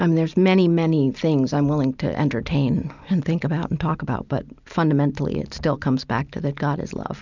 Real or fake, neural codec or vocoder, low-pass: real; none; 7.2 kHz